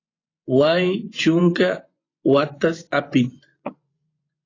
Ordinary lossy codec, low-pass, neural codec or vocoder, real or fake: AAC, 32 kbps; 7.2 kHz; vocoder, 24 kHz, 100 mel bands, Vocos; fake